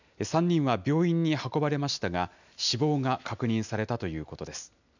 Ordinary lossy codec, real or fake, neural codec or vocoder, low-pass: none; real; none; 7.2 kHz